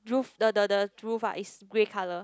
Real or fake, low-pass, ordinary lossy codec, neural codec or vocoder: real; none; none; none